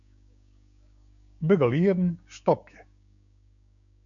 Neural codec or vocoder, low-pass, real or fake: codec, 16 kHz, 6 kbps, DAC; 7.2 kHz; fake